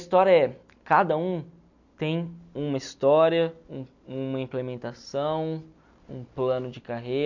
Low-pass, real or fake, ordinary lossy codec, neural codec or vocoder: 7.2 kHz; real; none; none